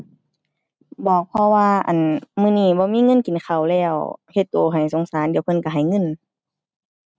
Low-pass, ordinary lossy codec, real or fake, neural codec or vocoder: none; none; real; none